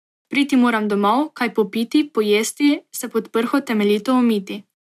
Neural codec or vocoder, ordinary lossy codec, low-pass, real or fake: none; AAC, 96 kbps; 14.4 kHz; real